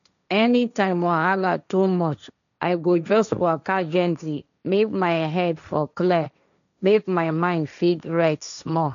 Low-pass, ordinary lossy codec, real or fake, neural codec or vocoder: 7.2 kHz; none; fake; codec, 16 kHz, 1.1 kbps, Voila-Tokenizer